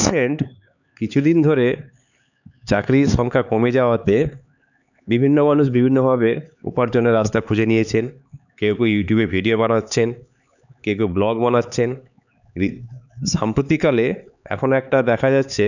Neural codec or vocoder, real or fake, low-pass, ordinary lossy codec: codec, 16 kHz, 4 kbps, X-Codec, HuBERT features, trained on LibriSpeech; fake; 7.2 kHz; none